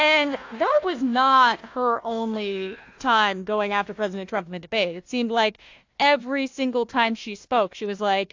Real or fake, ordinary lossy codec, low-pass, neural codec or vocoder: fake; AAC, 48 kbps; 7.2 kHz; codec, 16 kHz, 1 kbps, FunCodec, trained on Chinese and English, 50 frames a second